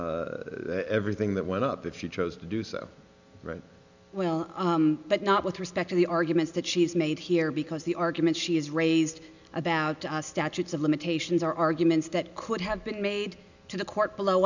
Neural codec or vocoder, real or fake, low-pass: none; real; 7.2 kHz